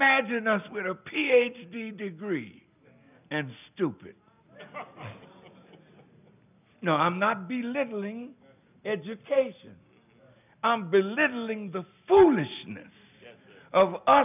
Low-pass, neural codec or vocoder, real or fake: 3.6 kHz; none; real